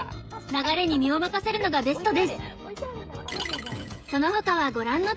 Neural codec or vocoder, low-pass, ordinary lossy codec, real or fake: codec, 16 kHz, 16 kbps, FreqCodec, smaller model; none; none; fake